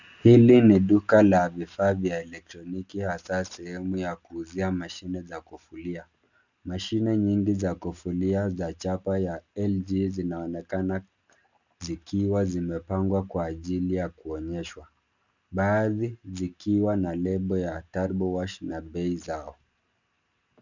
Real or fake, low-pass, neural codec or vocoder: real; 7.2 kHz; none